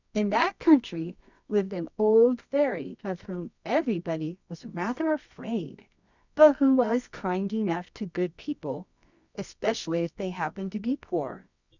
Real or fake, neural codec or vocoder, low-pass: fake; codec, 24 kHz, 0.9 kbps, WavTokenizer, medium music audio release; 7.2 kHz